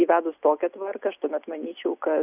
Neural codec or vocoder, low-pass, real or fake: none; 3.6 kHz; real